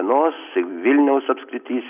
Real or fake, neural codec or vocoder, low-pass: real; none; 3.6 kHz